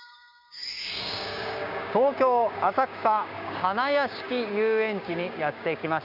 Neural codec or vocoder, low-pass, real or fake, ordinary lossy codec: codec, 16 kHz, 6 kbps, DAC; 5.4 kHz; fake; none